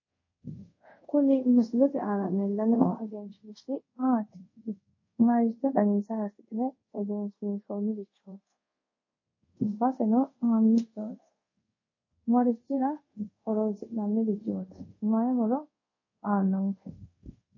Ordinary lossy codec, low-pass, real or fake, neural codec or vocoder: MP3, 32 kbps; 7.2 kHz; fake; codec, 24 kHz, 0.5 kbps, DualCodec